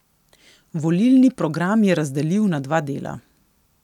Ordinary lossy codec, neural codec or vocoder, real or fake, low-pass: none; none; real; 19.8 kHz